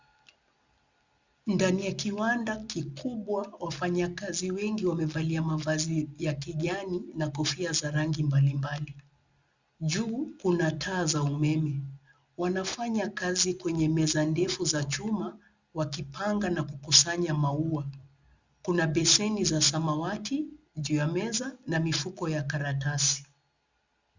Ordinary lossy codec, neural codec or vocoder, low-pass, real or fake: Opus, 64 kbps; none; 7.2 kHz; real